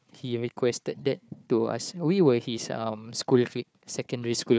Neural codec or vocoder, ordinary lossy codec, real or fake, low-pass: codec, 16 kHz, 4 kbps, FunCodec, trained on Chinese and English, 50 frames a second; none; fake; none